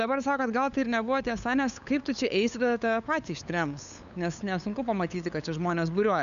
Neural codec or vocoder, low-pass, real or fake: codec, 16 kHz, 8 kbps, FunCodec, trained on LibriTTS, 25 frames a second; 7.2 kHz; fake